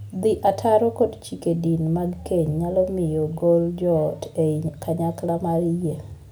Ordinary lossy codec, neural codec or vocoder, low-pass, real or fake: none; none; none; real